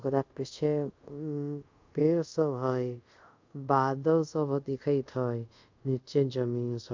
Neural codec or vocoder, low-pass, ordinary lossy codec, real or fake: codec, 24 kHz, 0.5 kbps, DualCodec; 7.2 kHz; MP3, 64 kbps; fake